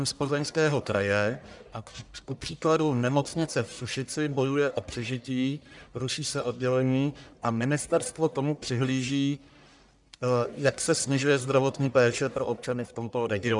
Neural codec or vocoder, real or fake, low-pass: codec, 44.1 kHz, 1.7 kbps, Pupu-Codec; fake; 10.8 kHz